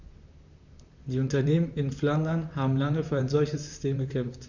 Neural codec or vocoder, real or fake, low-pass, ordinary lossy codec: vocoder, 44.1 kHz, 128 mel bands, Pupu-Vocoder; fake; 7.2 kHz; none